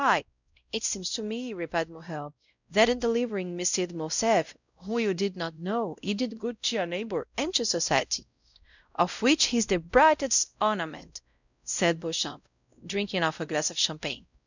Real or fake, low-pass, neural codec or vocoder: fake; 7.2 kHz; codec, 16 kHz, 0.5 kbps, X-Codec, WavLM features, trained on Multilingual LibriSpeech